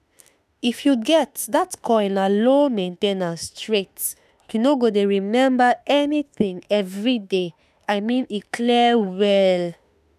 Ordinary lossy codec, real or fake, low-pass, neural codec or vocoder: none; fake; 14.4 kHz; autoencoder, 48 kHz, 32 numbers a frame, DAC-VAE, trained on Japanese speech